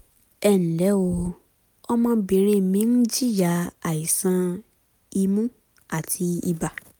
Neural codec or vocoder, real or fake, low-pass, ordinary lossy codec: none; real; none; none